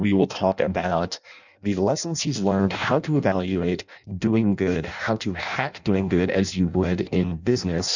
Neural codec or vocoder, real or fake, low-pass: codec, 16 kHz in and 24 kHz out, 0.6 kbps, FireRedTTS-2 codec; fake; 7.2 kHz